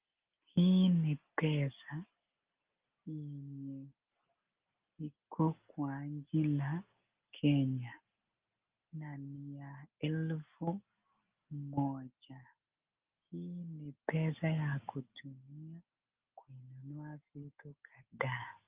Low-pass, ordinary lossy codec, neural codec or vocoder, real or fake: 3.6 kHz; Opus, 32 kbps; none; real